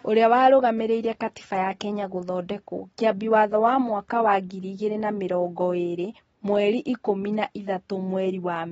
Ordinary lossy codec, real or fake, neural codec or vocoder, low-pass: AAC, 24 kbps; real; none; 9.9 kHz